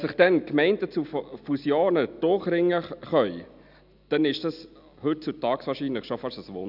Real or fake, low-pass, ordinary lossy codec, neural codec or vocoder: real; 5.4 kHz; none; none